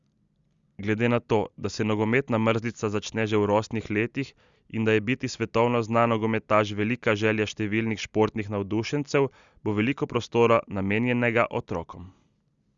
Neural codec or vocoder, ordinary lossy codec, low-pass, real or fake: none; Opus, 64 kbps; 7.2 kHz; real